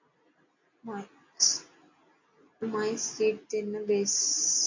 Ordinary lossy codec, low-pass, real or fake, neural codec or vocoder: AAC, 48 kbps; 7.2 kHz; real; none